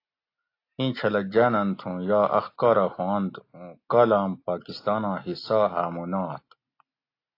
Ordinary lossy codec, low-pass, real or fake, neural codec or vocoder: AAC, 32 kbps; 5.4 kHz; real; none